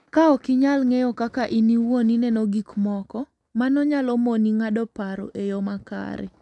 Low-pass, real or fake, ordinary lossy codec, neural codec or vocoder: 10.8 kHz; real; none; none